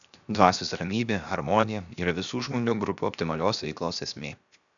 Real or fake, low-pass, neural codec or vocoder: fake; 7.2 kHz; codec, 16 kHz, 0.7 kbps, FocalCodec